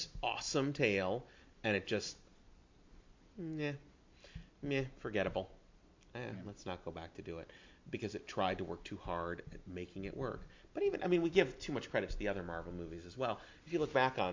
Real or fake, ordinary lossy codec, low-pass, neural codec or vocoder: real; MP3, 48 kbps; 7.2 kHz; none